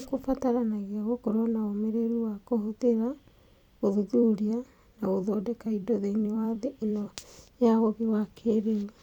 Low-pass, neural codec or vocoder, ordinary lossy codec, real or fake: 19.8 kHz; vocoder, 44.1 kHz, 128 mel bands every 256 samples, BigVGAN v2; none; fake